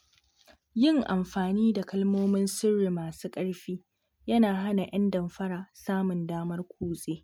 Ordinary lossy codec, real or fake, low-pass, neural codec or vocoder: MP3, 96 kbps; real; 19.8 kHz; none